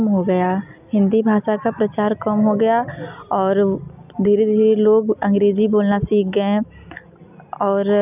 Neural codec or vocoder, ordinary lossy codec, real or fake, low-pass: none; none; real; 3.6 kHz